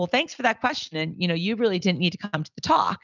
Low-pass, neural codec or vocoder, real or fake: 7.2 kHz; none; real